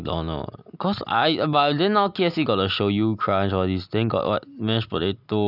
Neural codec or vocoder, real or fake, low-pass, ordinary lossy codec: none; real; 5.4 kHz; none